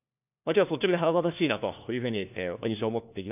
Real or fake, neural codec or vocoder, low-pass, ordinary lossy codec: fake; codec, 16 kHz, 1 kbps, FunCodec, trained on LibriTTS, 50 frames a second; 3.6 kHz; none